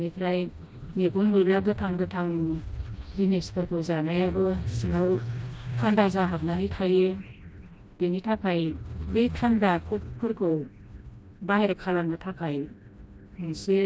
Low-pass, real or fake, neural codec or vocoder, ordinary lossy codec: none; fake; codec, 16 kHz, 1 kbps, FreqCodec, smaller model; none